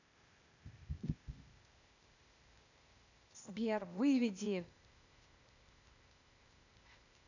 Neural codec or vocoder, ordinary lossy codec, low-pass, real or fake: codec, 16 kHz, 0.8 kbps, ZipCodec; none; 7.2 kHz; fake